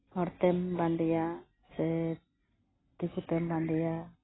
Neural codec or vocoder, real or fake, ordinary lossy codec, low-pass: none; real; AAC, 16 kbps; 7.2 kHz